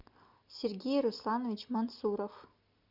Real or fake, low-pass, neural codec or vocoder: real; 5.4 kHz; none